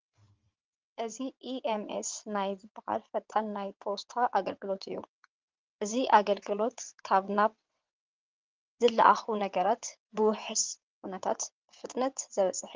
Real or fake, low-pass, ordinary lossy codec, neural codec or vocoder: fake; 7.2 kHz; Opus, 32 kbps; vocoder, 22.05 kHz, 80 mel bands, WaveNeXt